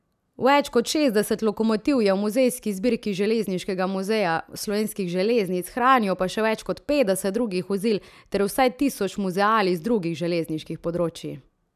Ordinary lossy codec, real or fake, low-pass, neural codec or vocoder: none; real; 14.4 kHz; none